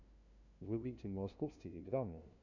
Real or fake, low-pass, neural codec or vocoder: fake; 7.2 kHz; codec, 16 kHz, 0.5 kbps, FunCodec, trained on LibriTTS, 25 frames a second